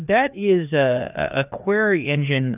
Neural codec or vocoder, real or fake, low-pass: codec, 16 kHz, 2 kbps, FreqCodec, larger model; fake; 3.6 kHz